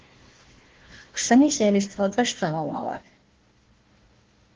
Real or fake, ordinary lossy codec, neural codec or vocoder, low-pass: fake; Opus, 16 kbps; codec, 16 kHz, 1 kbps, FunCodec, trained on Chinese and English, 50 frames a second; 7.2 kHz